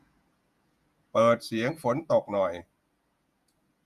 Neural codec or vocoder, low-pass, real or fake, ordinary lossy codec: vocoder, 48 kHz, 128 mel bands, Vocos; 14.4 kHz; fake; Opus, 64 kbps